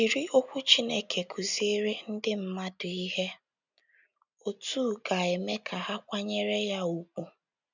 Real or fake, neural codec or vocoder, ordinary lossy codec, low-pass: real; none; none; 7.2 kHz